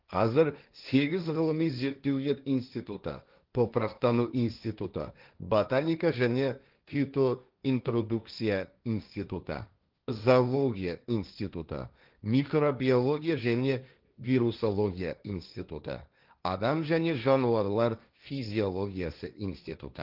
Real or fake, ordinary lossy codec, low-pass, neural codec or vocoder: fake; Opus, 32 kbps; 5.4 kHz; codec, 16 kHz, 1.1 kbps, Voila-Tokenizer